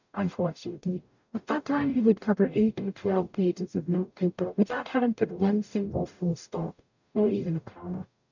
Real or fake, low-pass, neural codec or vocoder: fake; 7.2 kHz; codec, 44.1 kHz, 0.9 kbps, DAC